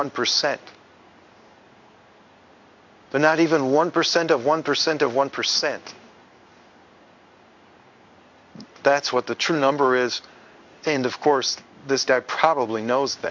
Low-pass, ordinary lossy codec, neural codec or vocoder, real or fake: 7.2 kHz; MP3, 64 kbps; codec, 16 kHz in and 24 kHz out, 1 kbps, XY-Tokenizer; fake